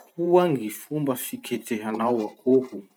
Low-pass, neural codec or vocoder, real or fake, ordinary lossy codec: none; vocoder, 44.1 kHz, 128 mel bands every 512 samples, BigVGAN v2; fake; none